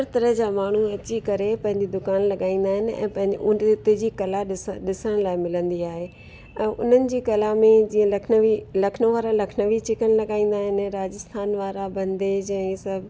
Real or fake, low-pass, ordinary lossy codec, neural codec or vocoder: real; none; none; none